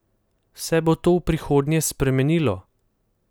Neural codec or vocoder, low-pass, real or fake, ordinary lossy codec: none; none; real; none